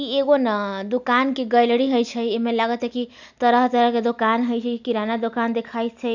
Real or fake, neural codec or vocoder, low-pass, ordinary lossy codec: real; none; 7.2 kHz; none